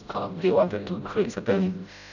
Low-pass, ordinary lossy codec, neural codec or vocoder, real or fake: 7.2 kHz; none; codec, 16 kHz, 0.5 kbps, FreqCodec, smaller model; fake